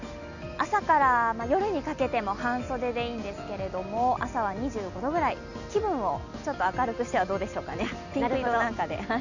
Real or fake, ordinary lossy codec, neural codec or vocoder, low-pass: real; none; none; 7.2 kHz